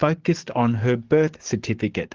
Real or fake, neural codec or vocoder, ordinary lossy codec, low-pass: fake; codec, 44.1 kHz, 7.8 kbps, DAC; Opus, 16 kbps; 7.2 kHz